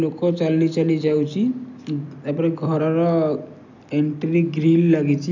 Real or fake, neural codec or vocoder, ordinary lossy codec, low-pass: real; none; none; 7.2 kHz